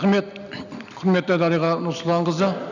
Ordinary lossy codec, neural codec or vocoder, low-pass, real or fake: none; none; 7.2 kHz; real